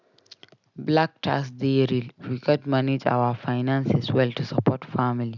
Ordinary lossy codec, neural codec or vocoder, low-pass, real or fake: none; none; 7.2 kHz; real